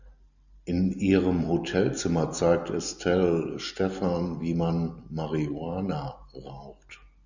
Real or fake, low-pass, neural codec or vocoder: real; 7.2 kHz; none